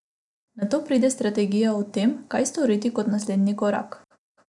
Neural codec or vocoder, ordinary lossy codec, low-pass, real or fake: none; none; 10.8 kHz; real